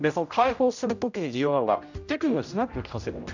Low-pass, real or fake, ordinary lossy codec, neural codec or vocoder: 7.2 kHz; fake; none; codec, 16 kHz, 0.5 kbps, X-Codec, HuBERT features, trained on general audio